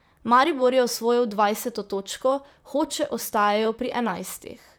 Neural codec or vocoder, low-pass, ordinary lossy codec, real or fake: vocoder, 44.1 kHz, 128 mel bands, Pupu-Vocoder; none; none; fake